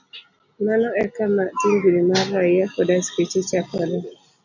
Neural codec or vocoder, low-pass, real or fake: none; 7.2 kHz; real